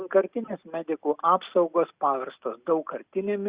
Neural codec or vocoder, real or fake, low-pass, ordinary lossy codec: none; real; 3.6 kHz; Opus, 64 kbps